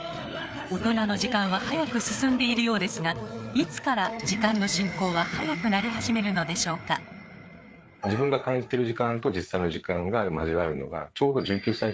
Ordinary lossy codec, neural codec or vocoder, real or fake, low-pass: none; codec, 16 kHz, 4 kbps, FreqCodec, larger model; fake; none